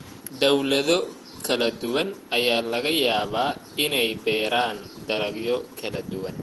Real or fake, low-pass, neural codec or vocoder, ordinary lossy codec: fake; 14.4 kHz; vocoder, 48 kHz, 128 mel bands, Vocos; Opus, 24 kbps